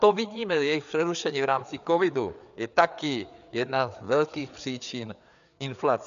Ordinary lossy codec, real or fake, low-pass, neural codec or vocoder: AAC, 96 kbps; fake; 7.2 kHz; codec, 16 kHz, 4 kbps, FreqCodec, larger model